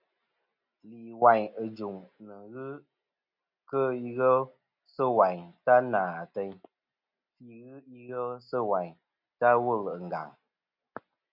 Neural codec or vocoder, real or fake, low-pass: none; real; 5.4 kHz